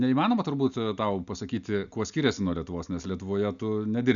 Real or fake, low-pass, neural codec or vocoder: real; 7.2 kHz; none